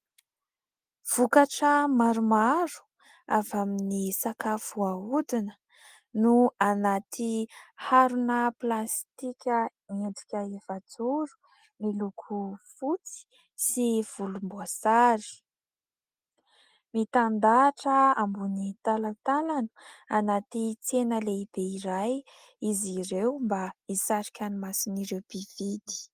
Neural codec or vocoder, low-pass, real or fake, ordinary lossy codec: none; 14.4 kHz; real; Opus, 24 kbps